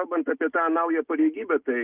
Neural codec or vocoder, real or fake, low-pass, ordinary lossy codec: none; real; 3.6 kHz; Opus, 24 kbps